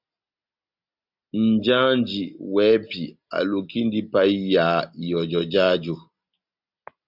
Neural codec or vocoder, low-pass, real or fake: none; 5.4 kHz; real